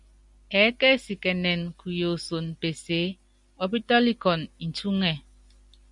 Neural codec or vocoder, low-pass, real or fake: none; 10.8 kHz; real